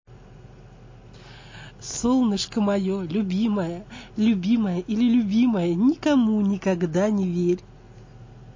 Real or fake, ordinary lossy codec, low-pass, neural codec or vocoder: real; MP3, 32 kbps; 7.2 kHz; none